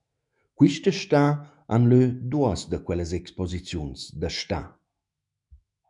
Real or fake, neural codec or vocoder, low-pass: fake; autoencoder, 48 kHz, 128 numbers a frame, DAC-VAE, trained on Japanese speech; 10.8 kHz